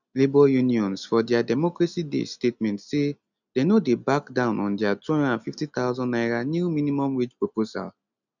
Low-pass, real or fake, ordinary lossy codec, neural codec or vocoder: 7.2 kHz; real; none; none